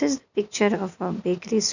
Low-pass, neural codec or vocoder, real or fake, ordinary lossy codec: 7.2 kHz; none; real; none